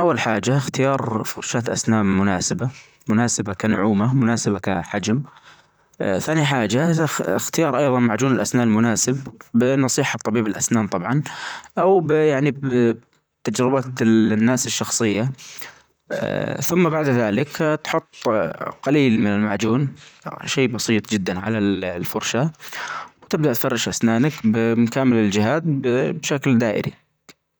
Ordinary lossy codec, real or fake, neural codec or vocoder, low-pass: none; fake; vocoder, 44.1 kHz, 128 mel bands, Pupu-Vocoder; none